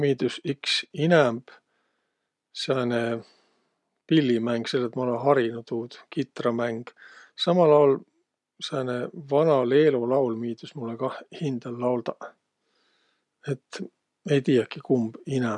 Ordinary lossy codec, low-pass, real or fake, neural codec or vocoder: none; 10.8 kHz; real; none